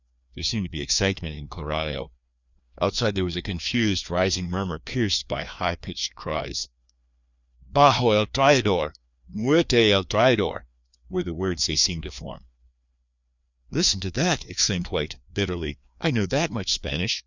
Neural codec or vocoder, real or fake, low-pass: codec, 16 kHz, 2 kbps, FreqCodec, larger model; fake; 7.2 kHz